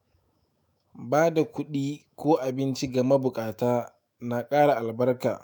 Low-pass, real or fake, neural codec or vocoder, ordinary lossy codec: none; fake; autoencoder, 48 kHz, 128 numbers a frame, DAC-VAE, trained on Japanese speech; none